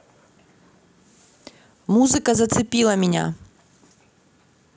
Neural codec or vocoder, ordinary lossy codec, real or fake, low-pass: none; none; real; none